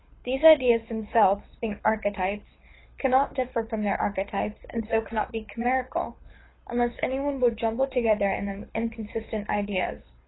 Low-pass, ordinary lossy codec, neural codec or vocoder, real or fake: 7.2 kHz; AAC, 16 kbps; codec, 24 kHz, 6 kbps, HILCodec; fake